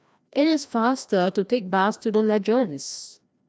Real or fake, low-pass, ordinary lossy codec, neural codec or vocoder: fake; none; none; codec, 16 kHz, 1 kbps, FreqCodec, larger model